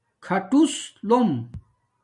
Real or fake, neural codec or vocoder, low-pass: real; none; 10.8 kHz